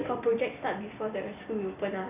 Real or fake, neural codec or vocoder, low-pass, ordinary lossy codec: fake; vocoder, 44.1 kHz, 128 mel bands every 256 samples, BigVGAN v2; 3.6 kHz; none